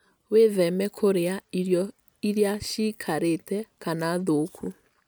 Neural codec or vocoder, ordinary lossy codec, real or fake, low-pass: none; none; real; none